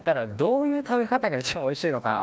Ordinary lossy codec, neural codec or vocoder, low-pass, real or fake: none; codec, 16 kHz, 1 kbps, FreqCodec, larger model; none; fake